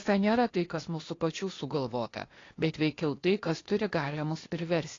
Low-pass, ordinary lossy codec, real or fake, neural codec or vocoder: 7.2 kHz; AAC, 32 kbps; fake; codec, 16 kHz, 0.8 kbps, ZipCodec